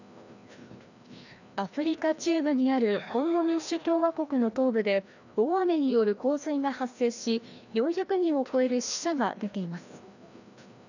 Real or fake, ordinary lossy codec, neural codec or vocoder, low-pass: fake; none; codec, 16 kHz, 1 kbps, FreqCodec, larger model; 7.2 kHz